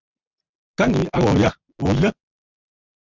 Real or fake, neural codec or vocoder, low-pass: real; none; 7.2 kHz